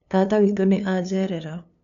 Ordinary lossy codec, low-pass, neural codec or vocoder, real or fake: none; 7.2 kHz; codec, 16 kHz, 2 kbps, FunCodec, trained on LibriTTS, 25 frames a second; fake